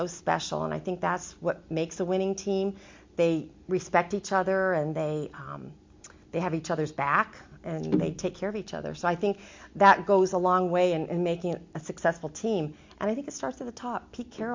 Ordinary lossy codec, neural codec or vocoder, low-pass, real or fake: MP3, 48 kbps; none; 7.2 kHz; real